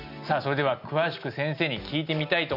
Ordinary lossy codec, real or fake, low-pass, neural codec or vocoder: none; real; 5.4 kHz; none